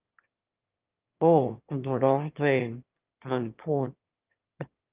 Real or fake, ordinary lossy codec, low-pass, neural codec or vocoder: fake; Opus, 32 kbps; 3.6 kHz; autoencoder, 22.05 kHz, a latent of 192 numbers a frame, VITS, trained on one speaker